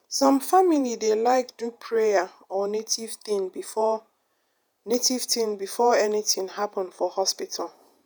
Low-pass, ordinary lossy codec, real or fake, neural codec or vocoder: none; none; fake; vocoder, 48 kHz, 128 mel bands, Vocos